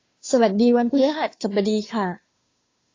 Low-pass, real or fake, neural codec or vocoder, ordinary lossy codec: 7.2 kHz; fake; codec, 16 kHz, 2 kbps, FunCodec, trained on Chinese and English, 25 frames a second; AAC, 32 kbps